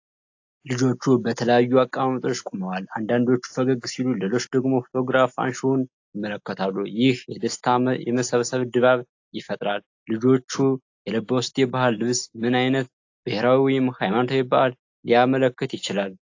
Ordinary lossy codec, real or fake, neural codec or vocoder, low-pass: AAC, 48 kbps; real; none; 7.2 kHz